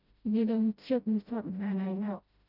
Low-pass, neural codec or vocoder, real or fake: 5.4 kHz; codec, 16 kHz, 0.5 kbps, FreqCodec, smaller model; fake